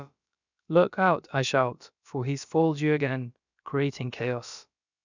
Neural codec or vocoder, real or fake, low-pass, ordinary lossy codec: codec, 16 kHz, about 1 kbps, DyCAST, with the encoder's durations; fake; 7.2 kHz; none